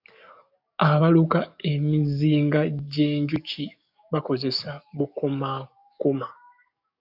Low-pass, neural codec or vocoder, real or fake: 5.4 kHz; codec, 44.1 kHz, 7.8 kbps, DAC; fake